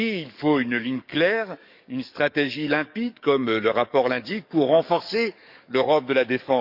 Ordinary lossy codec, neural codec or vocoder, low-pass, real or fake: none; codec, 44.1 kHz, 7.8 kbps, DAC; 5.4 kHz; fake